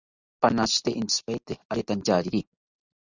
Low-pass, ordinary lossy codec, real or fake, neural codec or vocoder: 7.2 kHz; Opus, 64 kbps; real; none